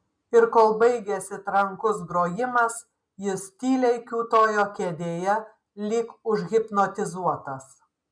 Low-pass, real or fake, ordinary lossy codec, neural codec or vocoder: 9.9 kHz; real; MP3, 96 kbps; none